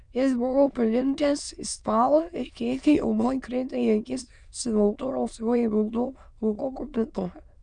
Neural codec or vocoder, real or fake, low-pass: autoencoder, 22.05 kHz, a latent of 192 numbers a frame, VITS, trained on many speakers; fake; 9.9 kHz